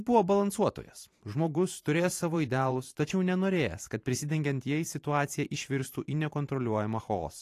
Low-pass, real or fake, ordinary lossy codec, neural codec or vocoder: 14.4 kHz; fake; AAC, 48 kbps; vocoder, 44.1 kHz, 128 mel bands every 512 samples, BigVGAN v2